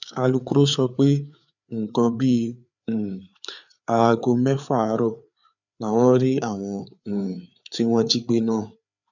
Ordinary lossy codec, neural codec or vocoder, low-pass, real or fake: none; codec, 16 kHz, 4 kbps, FreqCodec, larger model; 7.2 kHz; fake